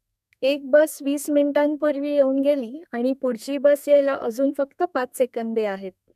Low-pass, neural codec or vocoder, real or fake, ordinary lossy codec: 14.4 kHz; codec, 32 kHz, 1.9 kbps, SNAC; fake; none